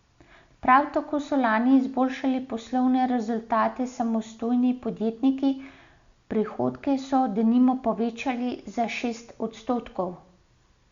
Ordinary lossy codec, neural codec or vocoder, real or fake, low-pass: Opus, 64 kbps; none; real; 7.2 kHz